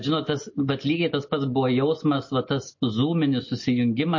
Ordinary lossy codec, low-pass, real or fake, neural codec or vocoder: MP3, 32 kbps; 7.2 kHz; real; none